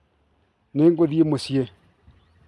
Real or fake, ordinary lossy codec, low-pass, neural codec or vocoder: real; none; none; none